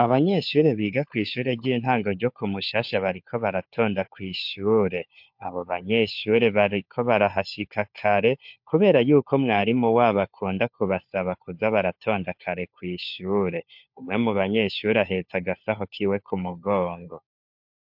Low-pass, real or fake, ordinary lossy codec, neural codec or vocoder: 5.4 kHz; fake; MP3, 48 kbps; codec, 16 kHz, 4 kbps, FunCodec, trained on LibriTTS, 50 frames a second